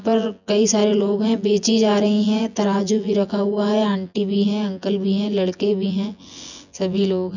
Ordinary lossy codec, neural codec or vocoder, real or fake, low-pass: none; vocoder, 24 kHz, 100 mel bands, Vocos; fake; 7.2 kHz